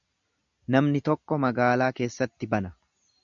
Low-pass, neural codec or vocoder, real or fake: 7.2 kHz; none; real